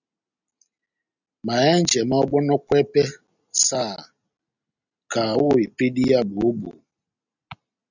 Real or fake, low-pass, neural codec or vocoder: real; 7.2 kHz; none